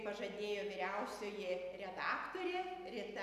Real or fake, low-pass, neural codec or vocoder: real; 14.4 kHz; none